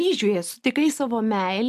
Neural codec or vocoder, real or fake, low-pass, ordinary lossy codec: none; real; 14.4 kHz; AAC, 96 kbps